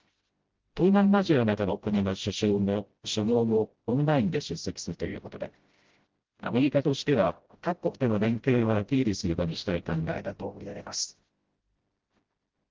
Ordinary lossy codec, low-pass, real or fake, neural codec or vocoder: Opus, 16 kbps; 7.2 kHz; fake; codec, 16 kHz, 0.5 kbps, FreqCodec, smaller model